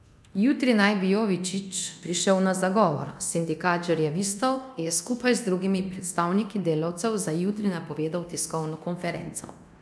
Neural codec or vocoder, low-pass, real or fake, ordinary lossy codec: codec, 24 kHz, 0.9 kbps, DualCodec; none; fake; none